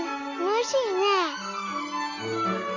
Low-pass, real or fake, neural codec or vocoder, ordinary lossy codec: 7.2 kHz; real; none; none